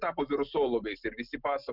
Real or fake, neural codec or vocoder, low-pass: real; none; 5.4 kHz